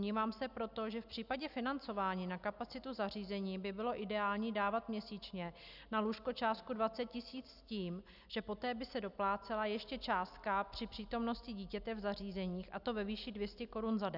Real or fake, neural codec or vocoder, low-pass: real; none; 5.4 kHz